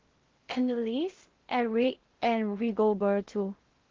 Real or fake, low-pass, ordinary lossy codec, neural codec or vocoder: fake; 7.2 kHz; Opus, 16 kbps; codec, 16 kHz in and 24 kHz out, 0.6 kbps, FocalCodec, streaming, 4096 codes